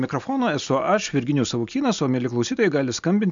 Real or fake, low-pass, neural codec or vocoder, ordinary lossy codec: real; 7.2 kHz; none; MP3, 64 kbps